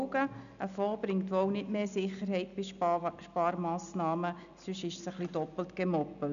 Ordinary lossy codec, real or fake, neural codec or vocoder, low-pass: none; real; none; 7.2 kHz